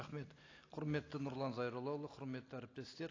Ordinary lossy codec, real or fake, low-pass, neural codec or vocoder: AAC, 32 kbps; real; 7.2 kHz; none